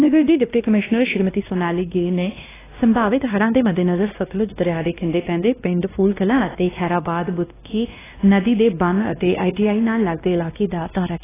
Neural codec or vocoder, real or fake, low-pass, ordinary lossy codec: codec, 16 kHz, 1 kbps, X-Codec, WavLM features, trained on Multilingual LibriSpeech; fake; 3.6 kHz; AAC, 16 kbps